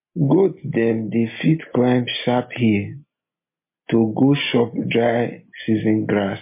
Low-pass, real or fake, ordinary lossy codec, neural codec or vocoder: 3.6 kHz; real; MP3, 24 kbps; none